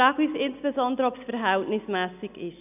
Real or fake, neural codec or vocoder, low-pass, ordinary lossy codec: real; none; 3.6 kHz; none